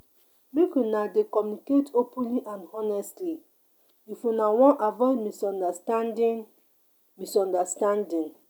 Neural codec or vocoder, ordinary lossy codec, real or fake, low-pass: none; none; real; none